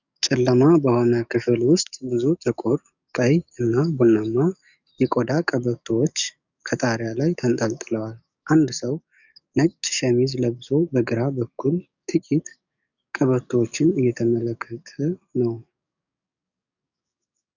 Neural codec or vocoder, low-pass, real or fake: codec, 44.1 kHz, 7.8 kbps, DAC; 7.2 kHz; fake